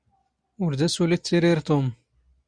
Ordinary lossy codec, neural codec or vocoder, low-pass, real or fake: Opus, 64 kbps; none; 9.9 kHz; real